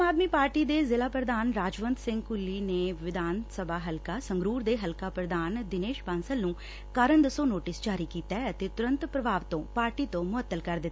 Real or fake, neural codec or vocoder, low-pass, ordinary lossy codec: real; none; none; none